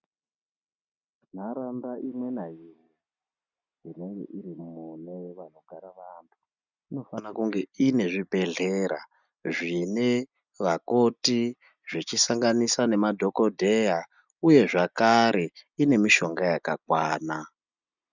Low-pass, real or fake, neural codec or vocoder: 7.2 kHz; real; none